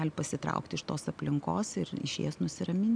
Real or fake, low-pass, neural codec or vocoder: real; 9.9 kHz; none